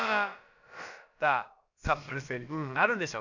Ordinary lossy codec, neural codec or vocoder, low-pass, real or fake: none; codec, 16 kHz, about 1 kbps, DyCAST, with the encoder's durations; 7.2 kHz; fake